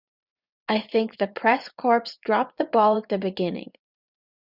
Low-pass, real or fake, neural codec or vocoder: 5.4 kHz; fake; vocoder, 24 kHz, 100 mel bands, Vocos